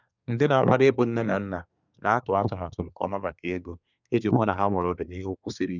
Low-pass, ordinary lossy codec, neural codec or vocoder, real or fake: 7.2 kHz; none; codec, 24 kHz, 1 kbps, SNAC; fake